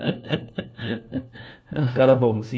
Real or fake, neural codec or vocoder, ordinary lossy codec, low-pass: fake; codec, 16 kHz, 1 kbps, FunCodec, trained on LibriTTS, 50 frames a second; none; none